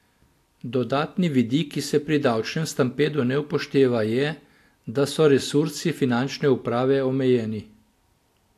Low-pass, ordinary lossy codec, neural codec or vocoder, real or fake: 14.4 kHz; AAC, 64 kbps; none; real